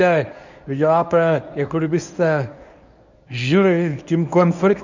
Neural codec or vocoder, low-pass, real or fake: codec, 24 kHz, 0.9 kbps, WavTokenizer, medium speech release version 1; 7.2 kHz; fake